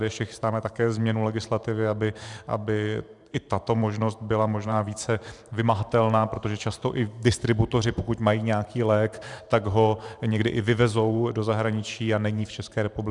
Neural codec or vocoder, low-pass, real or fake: vocoder, 44.1 kHz, 128 mel bands every 512 samples, BigVGAN v2; 10.8 kHz; fake